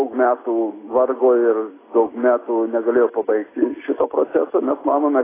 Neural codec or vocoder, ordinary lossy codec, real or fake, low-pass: none; AAC, 16 kbps; real; 3.6 kHz